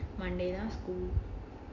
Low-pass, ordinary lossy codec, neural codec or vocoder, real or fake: 7.2 kHz; none; none; real